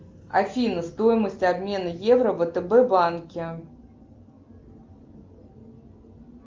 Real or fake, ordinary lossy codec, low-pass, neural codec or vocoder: real; Opus, 32 kbps; 7.2 kHz; none